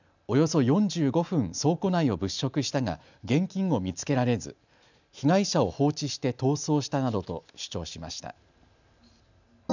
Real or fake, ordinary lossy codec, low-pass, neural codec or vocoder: real; none; 7.2 kHz; none